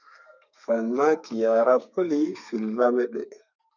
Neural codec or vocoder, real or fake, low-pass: codec, 32 kHz, 1.9 kbps, SNAC; fake; 7.2 kHz